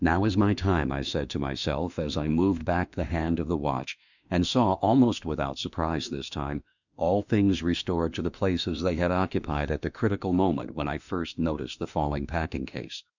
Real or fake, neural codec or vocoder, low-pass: fake; autoencoder, 48 kHz, 32 numbers a frame, DAC-VAE, trained on Japanese speech; 7.2 kHz